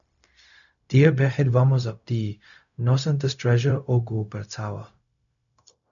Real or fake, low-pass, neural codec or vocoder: fake; 7.2 kHz; codec, 16 kHz, 0.4 kbps, LongCat-Audio-Codec